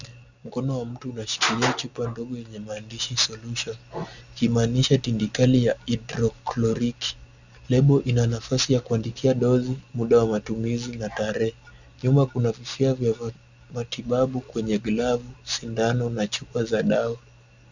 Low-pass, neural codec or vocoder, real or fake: 7.2 kHz; none; real